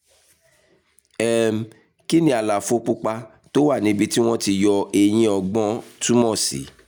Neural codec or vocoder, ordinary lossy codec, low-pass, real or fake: none; none; none; real